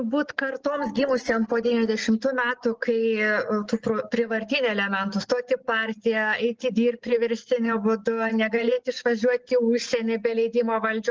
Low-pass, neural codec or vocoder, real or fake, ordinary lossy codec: 7.2 kHz; none; real; Opus, 16 kbps